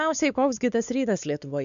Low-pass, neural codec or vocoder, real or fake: 7.2 kHz; codec, 16 kHz, 4 kbps, X-Codec, WavLM features, trained on Multilingual LibriSpeech; fake